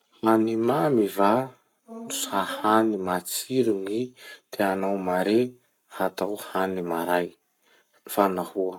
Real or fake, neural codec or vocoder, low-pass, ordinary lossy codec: fake; codec, 44.1 kHz, 7.8 kbps, Pupu-Codec; 19.8 kHz; none